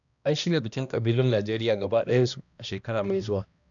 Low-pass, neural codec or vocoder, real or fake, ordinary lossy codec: 7.2 kHz; codec, 16 kHz, 1 kbps, X-Codec, HuBERT features, trained on balanced general audio; fake; none